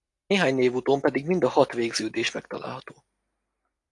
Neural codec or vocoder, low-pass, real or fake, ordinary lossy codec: none; 10.8 kHz; real; AAC, 48 kbps